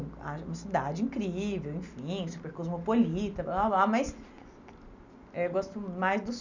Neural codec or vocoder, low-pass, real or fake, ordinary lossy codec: none; 7.2 kHz; real; none